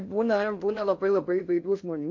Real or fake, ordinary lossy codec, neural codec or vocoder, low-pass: fake; AAC, 48 kbps; codec, 16 kHz in and 24 kHz out, 0.6 kbps, FocalCodec, streaming, 4096 codes; 7.2 kHz